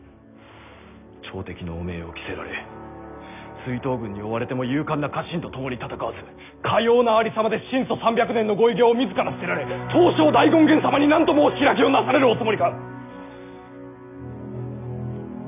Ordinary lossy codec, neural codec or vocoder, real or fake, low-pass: none; none; real; 3.6 kHz